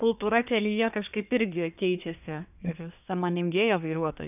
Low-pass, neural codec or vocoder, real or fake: 3.6 kHz; codec, 24 kHz, 1 kbps, SNAC; fake